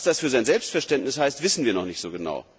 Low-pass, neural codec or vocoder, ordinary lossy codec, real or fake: none; none; none; real